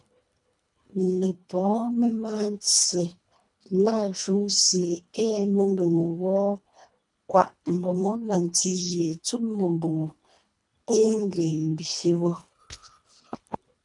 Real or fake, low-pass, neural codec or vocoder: fake; 10.8 kHz; codec, 24 kHz, 1.5 kbps, HILCodec